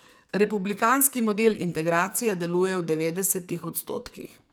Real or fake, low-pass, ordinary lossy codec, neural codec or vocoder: fake; none; none; codec, 44.1 kHz, 2.6 kbps, SNAC